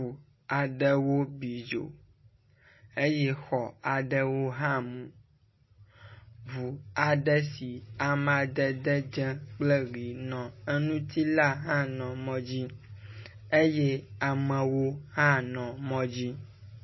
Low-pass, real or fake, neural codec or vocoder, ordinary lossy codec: 7.2 kHz; real; none; MP3, 24 kbps